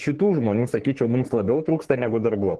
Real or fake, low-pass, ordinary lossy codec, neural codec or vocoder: fake; 10.8 kHz; Opus, 16 kbps; codec, 44.1 kHz, 3.4 kbps, Pupu-Codec